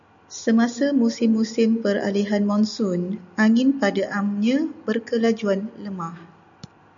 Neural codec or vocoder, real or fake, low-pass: none; real; 7.2 kHz